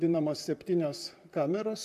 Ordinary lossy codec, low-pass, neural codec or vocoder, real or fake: MP3, 96 kbps; 14.4 kHz; vocoder, 44.1 kHz, 128 mel bands, Pupu-Vocoder; fake